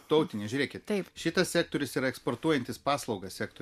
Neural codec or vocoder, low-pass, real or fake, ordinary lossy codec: none; 14.4 kHz; real; MP3, 96 kbps